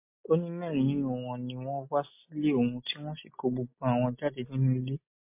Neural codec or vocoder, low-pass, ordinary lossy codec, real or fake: none; 3.6 kHz; MP3, 24 kbps; real